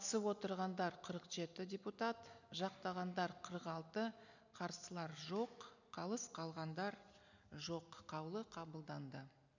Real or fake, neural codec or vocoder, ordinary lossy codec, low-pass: real; none; none; 7.2 kHz